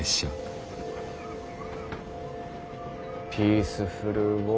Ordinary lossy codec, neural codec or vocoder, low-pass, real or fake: none; none; none; real